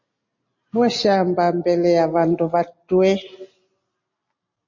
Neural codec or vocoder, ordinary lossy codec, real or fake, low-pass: none; MP3, 32 kbps; real; 7.2 kHz